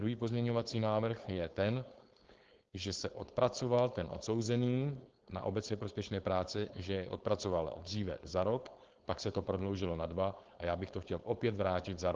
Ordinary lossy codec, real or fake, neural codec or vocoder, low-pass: Opus, 16 kbps; fake; codec, 16 kHz, 4.8 kbps, FACodec; 7.2 kHz